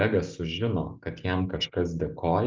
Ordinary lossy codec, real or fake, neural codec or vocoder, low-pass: Opus, 24 kbps; real; none; 7.2 kHz